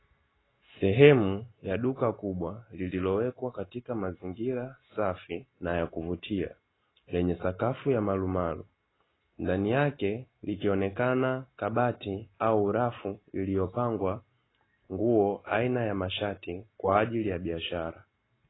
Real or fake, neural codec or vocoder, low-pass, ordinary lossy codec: real; none; 7.2 kHz; AAC, 16 kbps